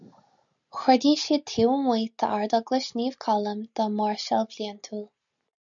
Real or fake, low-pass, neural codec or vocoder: real; 7.2 kHz; none